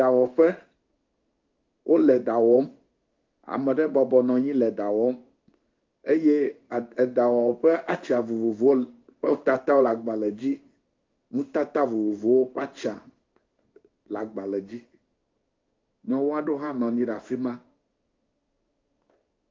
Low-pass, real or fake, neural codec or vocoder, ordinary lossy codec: 7.2 kHz; fake; codec, 16 kHz in and 24 kHz out, 1 kbps, XY-Tokenizer; Opus, 24 kbps